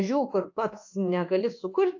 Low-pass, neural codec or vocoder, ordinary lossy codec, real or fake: 7.2 kHz; codec, 24 kHz, 1.2 kbps, DualCodec; AAC, 48 kbps; fake